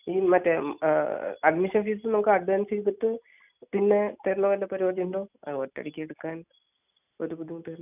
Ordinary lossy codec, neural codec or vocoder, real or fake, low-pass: none; none; real; 3.6 kHz